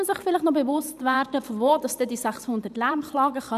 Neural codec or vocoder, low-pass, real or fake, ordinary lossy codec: vocoder, 44.1 kHz, 128 mel bands every 512 samples, BigVGAN v2; 14.4 kHz; fake; none